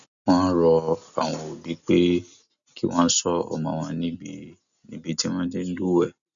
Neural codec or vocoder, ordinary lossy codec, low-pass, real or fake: none; none; 7.2 kHz; real